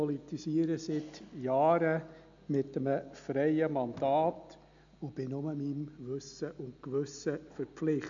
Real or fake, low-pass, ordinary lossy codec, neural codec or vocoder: real; 7.2 kHz; MP3, 64 kbps; none